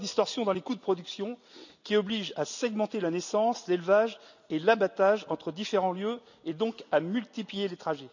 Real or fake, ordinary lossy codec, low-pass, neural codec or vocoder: real; none; 7.2 kHz; none